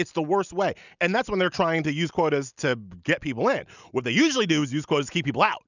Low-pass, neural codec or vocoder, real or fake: 7.2 kHz; none; real